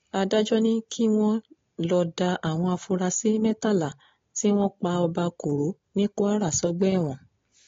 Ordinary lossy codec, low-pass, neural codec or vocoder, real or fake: AAC, 32 kbps; 7.2 kHz; none; real